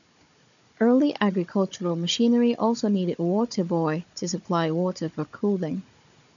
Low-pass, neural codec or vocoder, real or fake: 7.2 kHz; codec, 16 kHz, 16 kbps, FunCodec, trained on Chinese and English, 50 frames a second; fake